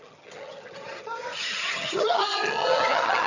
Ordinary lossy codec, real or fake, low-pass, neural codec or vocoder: none; fake; 7.2 kHz; vocoder, 22.05 kHz, 80 mel bands, HiFi-GAN